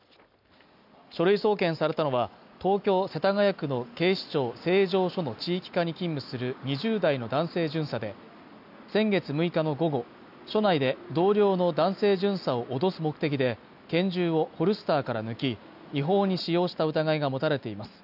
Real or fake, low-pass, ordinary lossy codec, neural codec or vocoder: real; 5.4 kHz; none; none